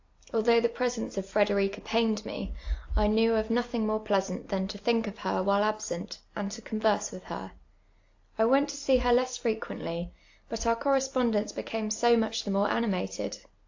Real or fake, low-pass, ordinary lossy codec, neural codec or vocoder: real; 7.2 kHz; AAC, 48 kbps; none